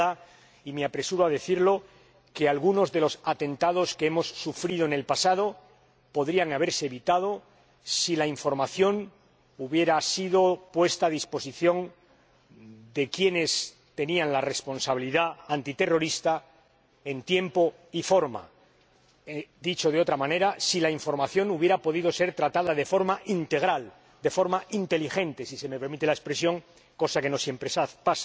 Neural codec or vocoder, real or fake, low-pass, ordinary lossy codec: none; real; none; none